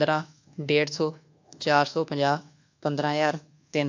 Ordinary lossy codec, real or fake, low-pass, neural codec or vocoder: AAC, 48 kbps; fake; 7.2 kHz; codec, 24 kHz, 1.2 kbps, DualCodec